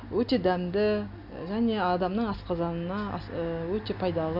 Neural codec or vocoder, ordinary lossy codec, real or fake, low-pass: none; none; real; 5.4 kHz